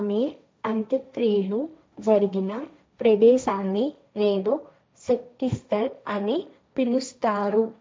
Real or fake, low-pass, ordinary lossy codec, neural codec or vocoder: fake; none; none; codec, 16 kHz, 1.1 kbps, Voila-Tokenizer